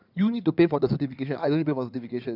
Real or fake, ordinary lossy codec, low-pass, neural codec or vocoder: fake; none; 5.4 kHz; codec, 16 kHz in and 24 kHz out, 2.2 kbps, FireRedTTS-2 codec